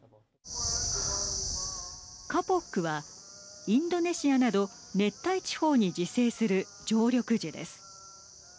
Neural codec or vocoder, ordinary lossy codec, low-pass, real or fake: none; none; none; real